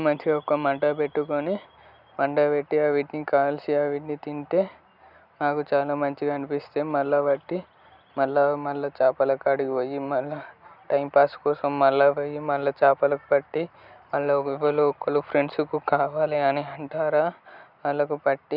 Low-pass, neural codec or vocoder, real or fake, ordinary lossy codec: 5.4 kHz; none; real; none